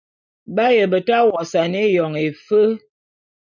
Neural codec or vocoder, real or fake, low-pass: none; real; 7.2 kHz